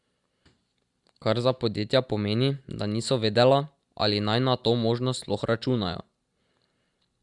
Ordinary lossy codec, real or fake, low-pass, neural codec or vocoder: Opus, 64 kbps; real; 10.8 kHz; none